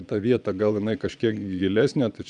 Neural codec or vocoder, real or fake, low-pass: vocoder, 22.05 kHz, 80 mel bands, Vocos; fake; 9.9 kHz